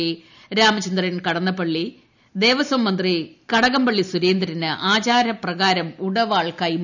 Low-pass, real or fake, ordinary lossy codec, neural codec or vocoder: 7.2 kHz; real; none; none